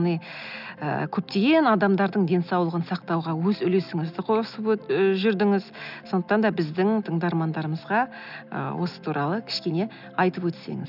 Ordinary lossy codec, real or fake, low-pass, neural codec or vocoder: none; real; 5.4 kHz; none